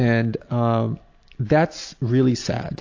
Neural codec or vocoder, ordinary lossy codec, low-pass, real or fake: none; AAC, 48 kbps; 7.2 kHz; real